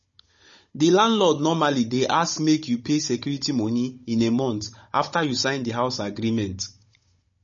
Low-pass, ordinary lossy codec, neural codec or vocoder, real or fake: 7.2 kHz; MP3, 32 kbps; codec, 16 kHz, 16 kbps, FunCodec, trained on Chinese and English, 50 frames a second; fake